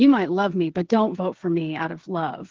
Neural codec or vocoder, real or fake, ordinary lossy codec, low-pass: codec, 16 kHz, 8 kbps, FreqCodec, smaller model; fake; Opus, 16 kbps; 7.2 kHz